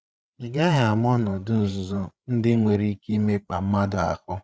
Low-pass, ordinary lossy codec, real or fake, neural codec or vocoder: none; none; fake; codec, 16 kHz, 8 kbps, FreqCodec, larger model